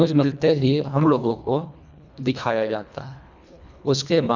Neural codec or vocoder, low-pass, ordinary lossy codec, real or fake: codec, 24 kHz, 1.5 kbps, HILCodec; 7.2 kHz; none; fake